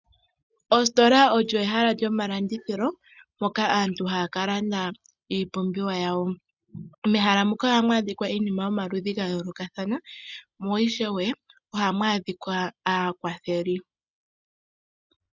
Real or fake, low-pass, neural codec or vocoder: real; 7.2 kHz; none